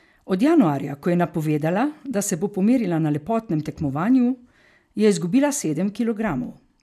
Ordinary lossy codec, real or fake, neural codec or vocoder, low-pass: none; real; none; 14.4 kHz